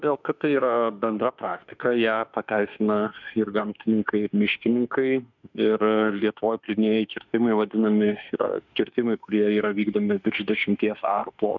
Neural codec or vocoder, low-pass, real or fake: autoencoder, 48 kHz, 32 numbers a frame, DAC-VAE, trained on Japanese speech; 7.2 kHz; fake